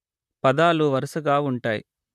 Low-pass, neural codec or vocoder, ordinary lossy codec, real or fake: 14.4 kHz; vocoder, 44.1 kHz, 128 mel bands, Pupu-Vocoder; none; fake